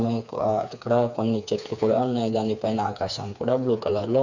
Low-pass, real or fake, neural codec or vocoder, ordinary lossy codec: 7.2 kHz; fake; codec, 16 kHz, 4 kbps, FreqCodec, smaller model; none